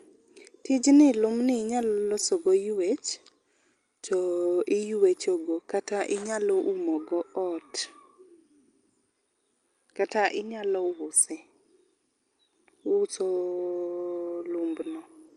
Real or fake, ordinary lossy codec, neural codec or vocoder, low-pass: real; Opus, 32 kbps; none; 9.9 kHz